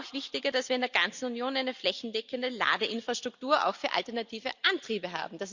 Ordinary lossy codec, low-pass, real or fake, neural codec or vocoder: Opus, 64 kbps; 7.2 kHz; real; none